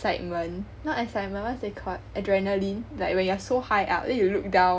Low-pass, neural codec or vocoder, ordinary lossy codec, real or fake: none; none; none; real